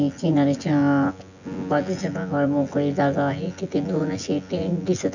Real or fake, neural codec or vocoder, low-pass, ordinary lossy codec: fake; vocoder, 24 kHz, 100 mel bands, Vocos; 7.2 kHz; none